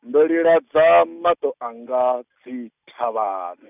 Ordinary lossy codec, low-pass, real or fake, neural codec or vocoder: none; 3.6 kHz; real; none